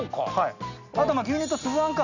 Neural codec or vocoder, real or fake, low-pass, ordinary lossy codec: none; real; 7.2 kHz; none